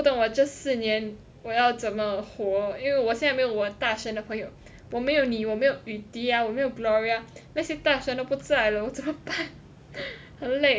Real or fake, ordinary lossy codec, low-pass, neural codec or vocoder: real; none; none; none